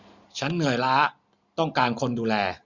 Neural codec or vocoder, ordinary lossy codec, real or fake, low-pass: none; none; real; 7.2 kHz